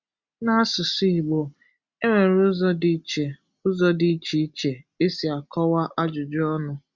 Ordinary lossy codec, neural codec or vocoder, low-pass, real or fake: Opus, 64 kbps; none; 7.2 kHz; real